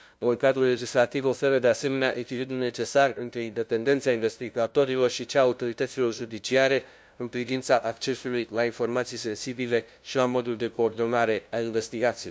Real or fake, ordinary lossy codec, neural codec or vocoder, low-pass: fake; none; codec, 16 kHz, 0.5 kbps, FunCodec, trained on LibriTTS, 25 frames a second; none